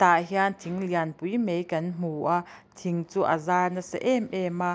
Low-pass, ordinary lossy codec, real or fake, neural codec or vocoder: none; none; real; none